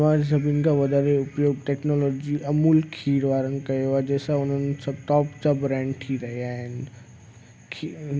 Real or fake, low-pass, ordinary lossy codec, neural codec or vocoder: real; none; none; none